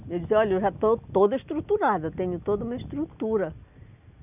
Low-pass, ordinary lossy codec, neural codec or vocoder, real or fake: 3.6 kHz; none; none; real